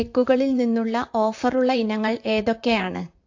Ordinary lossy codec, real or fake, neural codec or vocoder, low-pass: AAC, 48 kbps; fake; vocoder, 44.1 kHz, 128 mel bands, Pupu-Vocoder; 7.2 kHz